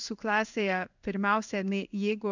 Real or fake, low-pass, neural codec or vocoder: fake; 7.2 kHz; codec, 16 kHz in and 24 kHz out, 1 kbps, XY-Tokenizer